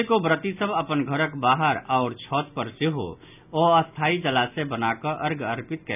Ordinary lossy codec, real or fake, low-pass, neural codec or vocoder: none; real; 3.6 kHz; none